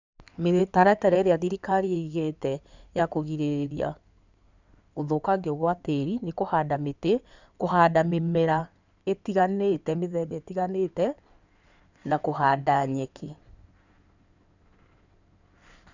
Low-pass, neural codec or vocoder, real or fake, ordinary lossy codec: 7.2 kHz; codec, 16 kHz in and 24 kHz out, 2.2 kbps, FireRedTTS-2 codec; fake; none